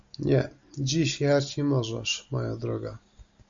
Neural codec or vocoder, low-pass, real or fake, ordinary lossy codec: none; 7.2 kHz; real; AAC, 64 kbps